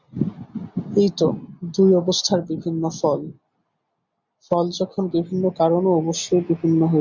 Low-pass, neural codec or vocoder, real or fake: 7.2 kHz; none; real